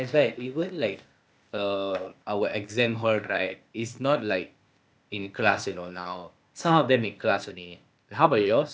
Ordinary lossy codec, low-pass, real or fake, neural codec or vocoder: none; none; fake; codec, 16 kHz, 0.8 kbps, ZipCodec